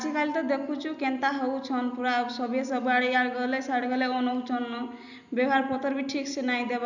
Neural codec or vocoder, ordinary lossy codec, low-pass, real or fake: none; none; 7.2 kHz; real